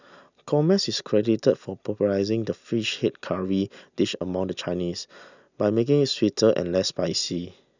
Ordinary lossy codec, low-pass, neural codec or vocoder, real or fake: none; 7.2 kHz; none; real